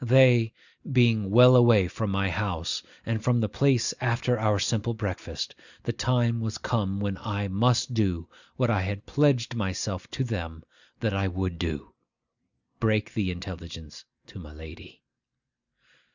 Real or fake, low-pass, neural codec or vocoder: real; 7.2 kHz; none